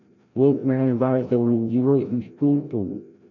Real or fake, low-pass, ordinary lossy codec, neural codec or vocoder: fake; 7.2 kHz; none; codec, 16 kHz, 0.5 kbps, FreqCodec, larger model